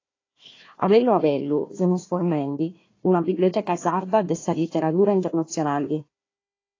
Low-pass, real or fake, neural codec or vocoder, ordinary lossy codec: 7.2 kHz; fake; codec, 16 kHz, 1 kbps, FunCodec, trained on Chinese and English, 50 frames a second; AAC, 32 kbps